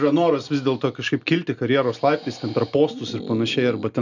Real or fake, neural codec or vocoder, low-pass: real; none; 7.2 kHz